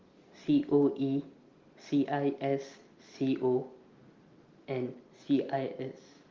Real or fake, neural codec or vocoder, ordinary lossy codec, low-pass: real; none; Opus, 32 kbps; 7.2 kHz